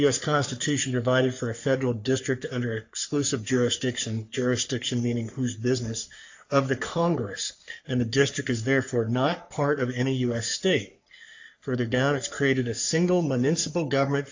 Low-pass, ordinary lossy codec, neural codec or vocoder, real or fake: 7.2 kHz; AAC, 48 kbps; codec, 44.1 kHz, 3.4 kbps, Pupu-Codec; fake